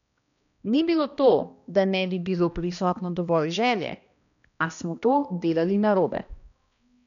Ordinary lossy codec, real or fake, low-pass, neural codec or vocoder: none; fake; 7.2 kHz; codec, 16 kHz, 1 kbps, X-Codec, HuBERT features, trained on balanced general audio